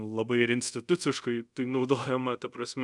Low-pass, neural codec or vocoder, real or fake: 10.8 kHz; codec, 24 kHz, 0.5 kbps, DualCodec; fake